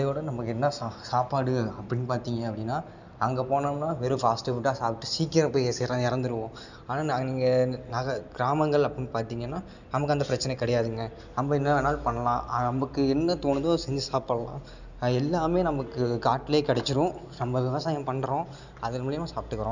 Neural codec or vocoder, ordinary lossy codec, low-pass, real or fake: none; none; 7.2 kHz; real